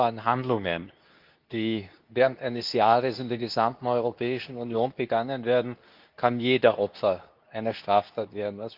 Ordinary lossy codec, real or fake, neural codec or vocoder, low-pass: Opus, 24 kbps; fake; codec, 24 kHz, 0.9 kbps, WavTokenizer, medium speech release version 2; 5.4 kHz